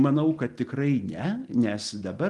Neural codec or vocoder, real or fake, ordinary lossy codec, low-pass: none; real; Opus, 24 kbps; 10.8 kHz